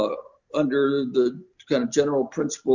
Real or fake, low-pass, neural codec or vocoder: real; 7.2 kHz; none